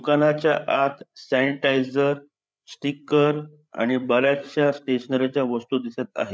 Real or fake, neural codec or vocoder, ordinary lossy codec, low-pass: fake; codec, 16 kHz, 8 kbps, FreqCodec, larger model; none; none